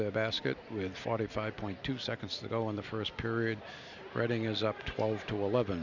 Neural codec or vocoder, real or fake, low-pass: none; real; 7.2 kHz